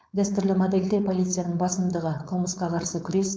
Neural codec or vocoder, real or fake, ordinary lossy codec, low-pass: codec, 16 kHz, 4.8 kbps, FACodec; fake; none; none